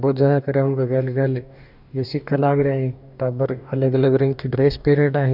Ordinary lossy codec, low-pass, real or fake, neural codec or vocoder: none; 5.4 kHz; fake; codec, 44.1 kHz, 2.6 kbps, DAC